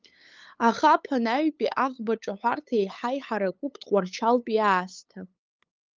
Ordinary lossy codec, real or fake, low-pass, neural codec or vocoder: Opus, 24 kbps; fake; 7.2 kHz; codec, 16 kHz, 8 kbps, FunCodec, trained on LibriTTS, 25 frames a second